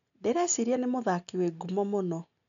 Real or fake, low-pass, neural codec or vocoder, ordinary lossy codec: real; 7.2 kHz; none; none